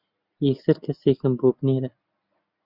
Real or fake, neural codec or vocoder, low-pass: real; none; 5.4 kHz